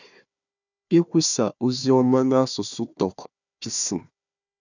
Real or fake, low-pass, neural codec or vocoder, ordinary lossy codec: fake; 7.2 kHz; codec, 16 kHz, 1 kbps, FunCodec, trained on Chinese and English, 50 frames a second; none